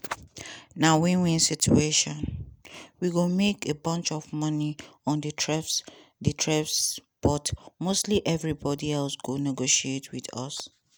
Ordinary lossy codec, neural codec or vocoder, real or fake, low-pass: none; none; real; none